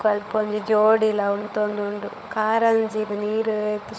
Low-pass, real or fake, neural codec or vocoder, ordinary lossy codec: none; fake; codec, 16 kHz, 16 kbps, FunCodec, trained on LibriTTS, 50 frames a second; none